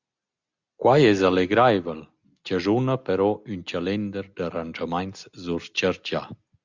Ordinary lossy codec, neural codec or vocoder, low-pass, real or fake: Opus, 64 kbps; none; 7.2 kHz; real